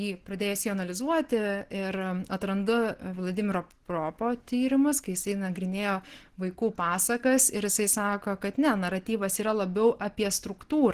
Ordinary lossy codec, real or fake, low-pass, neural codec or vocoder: Opus, 16 kbps; real; 14.4 kHz; none